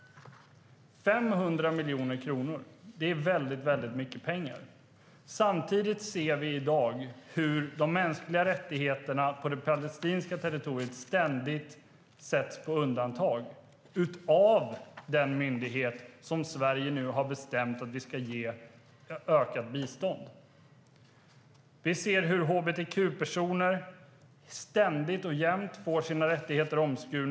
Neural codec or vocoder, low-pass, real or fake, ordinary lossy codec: none; none; real; none